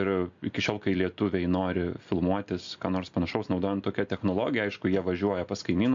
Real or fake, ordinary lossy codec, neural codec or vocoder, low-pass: real; MP3, 48 kbps; none; 7.2 kHz